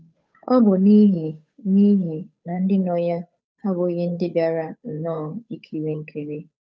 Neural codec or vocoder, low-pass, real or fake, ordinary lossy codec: codec, 16 kHz, 8 kbps, FunCodec, trained on Chinese and English, 25 frames a second; none; fake; none